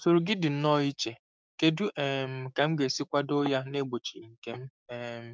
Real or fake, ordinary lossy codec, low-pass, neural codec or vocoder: fake; none; none; codec, 16 kHz, 6 kbps, DAC